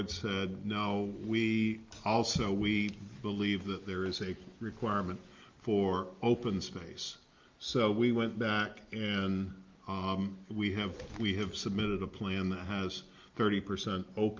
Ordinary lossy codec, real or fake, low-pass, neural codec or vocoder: Opus, 32 kbps; real; 7.2 kHz; none